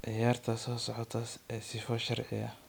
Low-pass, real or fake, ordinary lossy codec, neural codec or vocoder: none; real; none; none